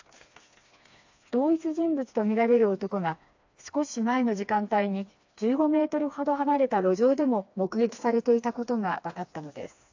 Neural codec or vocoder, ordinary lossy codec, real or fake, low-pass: codec, 16 kHz, 2 kbps, FreqCodec, smaller model; AAC, 48 kbps; fake; 7.2 kHz